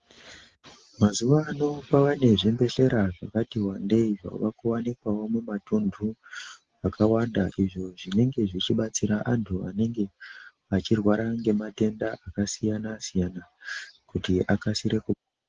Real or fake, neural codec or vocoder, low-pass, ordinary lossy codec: real; none; 7.2 kHz; Opus, 16 kbps